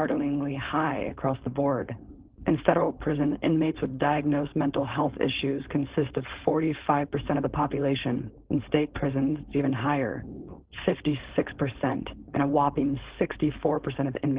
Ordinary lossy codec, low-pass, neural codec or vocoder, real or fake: Opus, 16 kbps; 3.6 kHz; codec, 16 kHz, 4.8 kbps, FACodec; fake